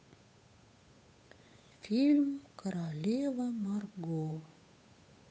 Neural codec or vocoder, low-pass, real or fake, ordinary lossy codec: codec, 16 kHz, 8 kbps, FunCodec, trained on Chinese and English, 25 frames a second; none; fake; none